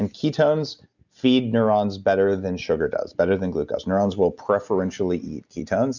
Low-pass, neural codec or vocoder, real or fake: 7.2 kHz; none; real